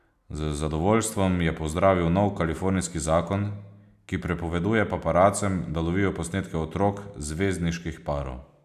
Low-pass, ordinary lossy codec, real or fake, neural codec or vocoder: 14.4 kHz; none; real; none